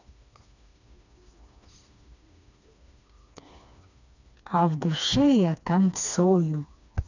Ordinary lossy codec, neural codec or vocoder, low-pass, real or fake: none; codec, 16 kHz, 2 kbps, FreqCodec, smaller model; 7.2 kHz; fake